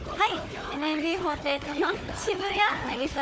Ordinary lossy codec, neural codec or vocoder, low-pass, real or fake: none; codec, 16 kHz, 4 kbps, FunCodec, trained on Chinese and English, 50 frames a second; none; fake